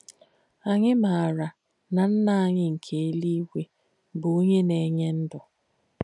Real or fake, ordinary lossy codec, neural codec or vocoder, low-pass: real; none; none; 10.8 kHz